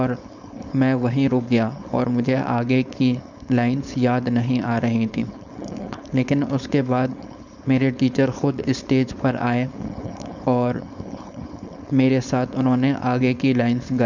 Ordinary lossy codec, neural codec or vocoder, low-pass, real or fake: none; codec, 16 kHz, 4.8 kbps, FACodec; 7.2 kHz; fake